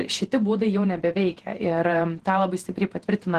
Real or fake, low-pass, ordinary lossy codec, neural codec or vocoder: fake; 14.4 kHz; Opus, 16 kbps; vocoder, 48 kHz, 128 mel bands, Vocos